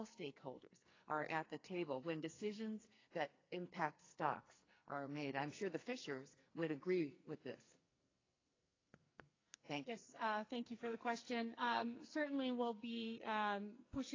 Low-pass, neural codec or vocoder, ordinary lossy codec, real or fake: 7.2 kHz; codec, 44.1 kHz, 2.6 kbps, SNAC; AAC, 32 kbps; fake